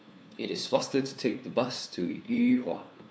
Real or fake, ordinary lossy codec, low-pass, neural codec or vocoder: fake; none; none; codec, 16 kHz, 2 kbps, FunCodec, trained on LibriTTS, 25 frames a second